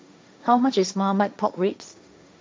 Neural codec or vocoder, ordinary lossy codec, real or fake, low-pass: codec, 16 kHz, 1.1 kbps, Voila-Tokenizer; none; fake; none